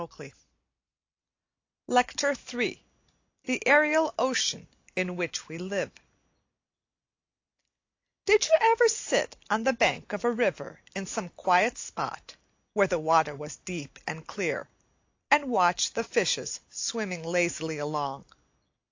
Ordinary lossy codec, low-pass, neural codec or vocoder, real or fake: AAC, 48 kbps; 7.2 kHz; none; real